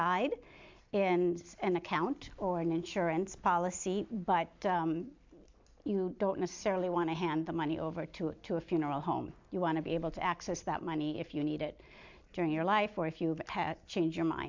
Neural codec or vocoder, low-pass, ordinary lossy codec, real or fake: none; 7.2 kHz; MP3, 64 kbps; real